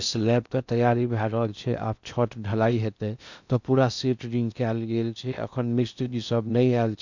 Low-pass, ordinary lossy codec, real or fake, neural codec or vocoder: 7.2 kHz; none; fake; codec, 16 kHz in and 24 kHz out, 0.6 kbps, FocalCodec, streaming, 2048 codes